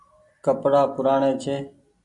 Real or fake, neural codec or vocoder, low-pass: real; none; 10.8 kHz